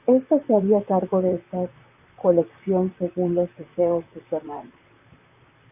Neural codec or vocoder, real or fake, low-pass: vocoder, 44.1 kHz, 128 mel bands, Pupu-Vocoder; fake; 3.6 kHz